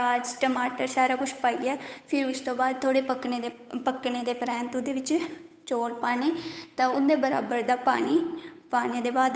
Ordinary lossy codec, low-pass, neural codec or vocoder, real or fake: none; none; codec, 16 kHz, 8 kbps, FunCodec, trained on Chinese and English, 25 frames a second; fake